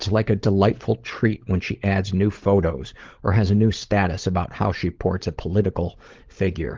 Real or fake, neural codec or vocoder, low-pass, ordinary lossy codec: fake; codec, 16 kHz, 16 kbps, FunCodec, trained on LibriTTS, 50 frames a second; 7.2 kHz; Opus, 16 kbps